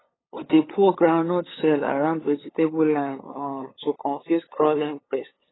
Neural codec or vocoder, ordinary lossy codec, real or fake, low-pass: codec, 16 kHz, 8 kbps, FunCodec, trained on LibriTTS, 25 frames a second; AAC, 16 kbps; fake; 7.2 kHz